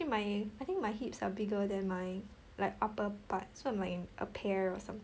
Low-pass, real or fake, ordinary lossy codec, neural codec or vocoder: none; real; none; none